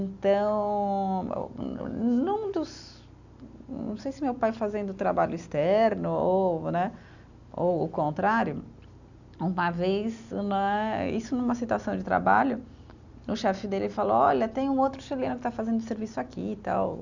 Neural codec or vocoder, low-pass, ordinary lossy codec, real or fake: none; 7.2 kHz; none; real